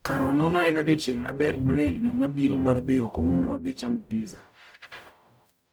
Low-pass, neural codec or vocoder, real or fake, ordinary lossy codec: none; codec, 44.1 kHz, 0.9 kbps, DAC; fake; none